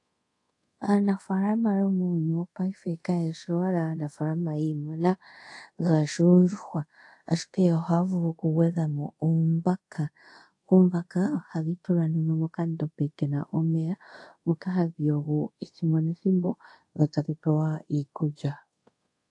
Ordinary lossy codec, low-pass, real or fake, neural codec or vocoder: AAC, 48 kbps; 10.8 kHz; fake; codec, 24 kHz, 0.5 kbps, DualCodec